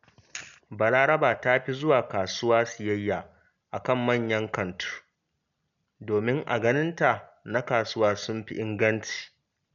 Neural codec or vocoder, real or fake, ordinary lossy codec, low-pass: none; real; none; 7.2 kHz